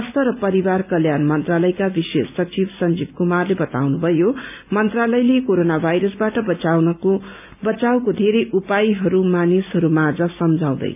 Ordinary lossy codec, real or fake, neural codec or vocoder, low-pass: none; real; none; 3.6 kHz